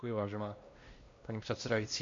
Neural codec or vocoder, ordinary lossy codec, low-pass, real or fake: codec, 16 kHz, 1 kbps, X-Codec, WavLM features, trained on Multilingual LibriSpeech; MP3, 48 kbps; 7.2 kHz; fake